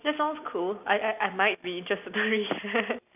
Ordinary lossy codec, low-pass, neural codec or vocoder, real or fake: none; 3.6 kHz; none; real